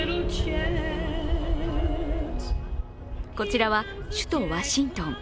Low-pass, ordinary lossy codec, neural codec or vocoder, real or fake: none; none; none; real